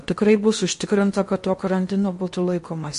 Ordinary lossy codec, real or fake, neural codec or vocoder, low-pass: MP3, 48 kbps; fake; codec, 16 kHz in and 24 kHz out, 0.8 kbps, FocalCodec, streaming, 65536 codes; 10.8 kHz